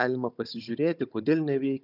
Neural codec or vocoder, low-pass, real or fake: codec, 16 kHz, 16 kbps, FunCodec, trained on Chinese and English, 50 frames a second; 5.4 kHz; fake